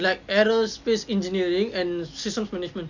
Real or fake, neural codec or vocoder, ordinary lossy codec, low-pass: real; none; none; 7.2 kHz